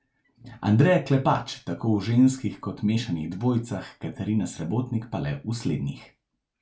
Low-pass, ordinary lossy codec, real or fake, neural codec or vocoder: none; none; real; none